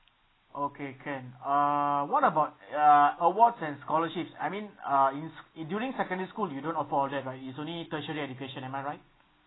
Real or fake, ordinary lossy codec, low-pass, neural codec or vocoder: real; AAC, 16 kbps; 7.2 kHz; none